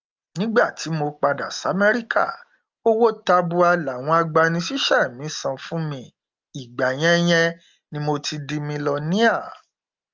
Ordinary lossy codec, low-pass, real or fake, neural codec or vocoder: Opus, 32 kbps; 7.2 kHz; real; none